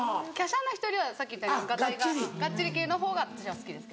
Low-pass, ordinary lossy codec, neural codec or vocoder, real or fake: none; none; none; real